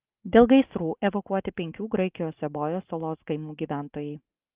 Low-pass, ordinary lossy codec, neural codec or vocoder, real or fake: 3.6 kHz; Opus, 24 kbps; none; real